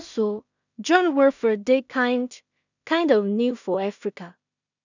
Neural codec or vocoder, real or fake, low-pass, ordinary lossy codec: codec, 16 kHz in and 24 kHz out, 0.4 kbps, LongCat-Audio-Codec, two codebook decoder; fake; 7.2 kHz; none